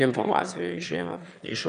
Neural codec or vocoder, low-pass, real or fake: autoencoder, 22.05 kHz, a latent of 192 numbers a frame, VITS, trained on one speaker; 9.9 kHz; fake